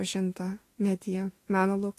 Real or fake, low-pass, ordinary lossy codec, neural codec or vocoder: fake; 14.4 kHz; AAC, 48 kbps; autoencoder, 48 kHz, 32 numbers a frame, DAC-VAE, trained on Japanese speech